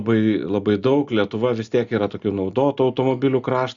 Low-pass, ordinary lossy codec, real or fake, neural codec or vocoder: 7.2 kHz; Opus, 64 kbps; real; none